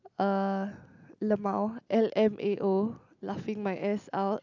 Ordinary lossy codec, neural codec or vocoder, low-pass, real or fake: none; none; 7.2 kHz; real